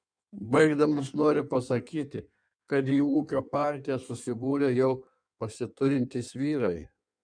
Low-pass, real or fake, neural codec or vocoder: 9.9 kHz; fake; codec, 16 kHz in and 24 kHz out, 1.1 kbps, FireRedTTS-2 codec